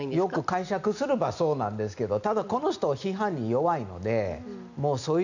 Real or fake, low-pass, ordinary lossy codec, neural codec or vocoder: real; 7.2 kHz; none; none